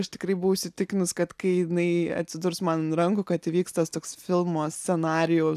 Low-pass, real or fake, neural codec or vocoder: 14.4 kHz; real; none